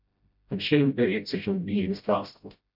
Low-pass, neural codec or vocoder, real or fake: 5.4 kHz; codec, 16 kHz, 0.5 kbps, FreqCodec, smaller model; fake